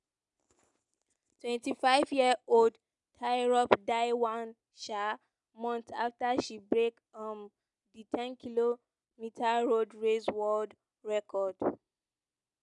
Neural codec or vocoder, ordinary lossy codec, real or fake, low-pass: none; none; real; 10.8 kHz